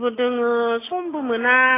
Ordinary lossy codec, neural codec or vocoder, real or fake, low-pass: AAC, 16 kbps; none; real; 3.6 kHz